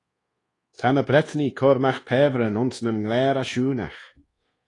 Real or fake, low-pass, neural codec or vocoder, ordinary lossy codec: fake; 10.8 kHz; codec, 24 kHz, 1.2 kbps, DualCodec; AAC, 32 kbps